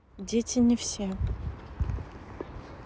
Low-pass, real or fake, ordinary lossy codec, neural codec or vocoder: none; real; none; none